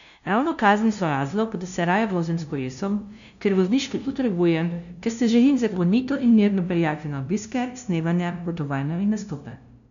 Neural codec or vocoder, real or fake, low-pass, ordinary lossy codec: codec, 16 kHz, 0.5 kbps, FunCodec, trained on LibriTTS, 25 frames a second; fake; 7.2 kHz; none